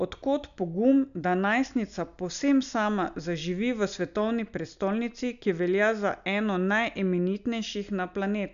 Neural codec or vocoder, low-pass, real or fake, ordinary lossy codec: none; 7.2 kHz; real; none